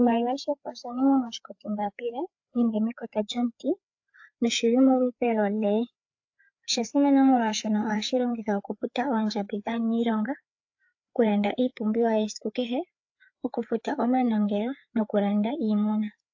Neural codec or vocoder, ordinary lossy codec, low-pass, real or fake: codec, 16 kHz, 4 kbps, FreqCodec, larger model; AAC, 48 kbps; 7.2 kHz; fake